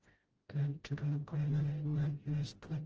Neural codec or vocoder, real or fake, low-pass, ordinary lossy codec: codec, 16 kHz, 0.5 kbps, FreqCodec, smaller model; fake; 7.2 kHz; Opus, 16 kbps